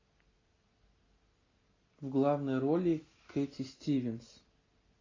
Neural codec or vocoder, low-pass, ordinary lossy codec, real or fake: none; 7.2 kHz; AAC, 32 kbps; real